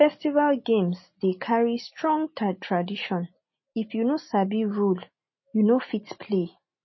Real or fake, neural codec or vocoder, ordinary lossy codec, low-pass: real; none; MP3, 24 kbps; 7.2 kHz